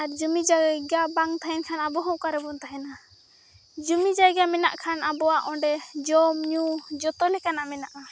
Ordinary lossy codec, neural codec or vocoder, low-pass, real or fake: none; none; none; real